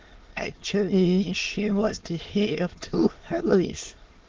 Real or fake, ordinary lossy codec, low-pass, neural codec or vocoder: fake; Opus, 16 kbps; 7.2 kHz; autoencoder, 22.05 kHz, a latent of 192 numbers a frame, VITS, trained on many speakers